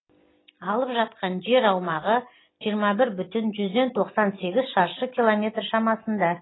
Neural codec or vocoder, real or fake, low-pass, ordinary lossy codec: none; real; 7.2 kHz; AAC, 16 kbps